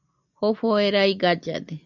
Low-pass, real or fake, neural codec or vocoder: 7.2 kHz; real; none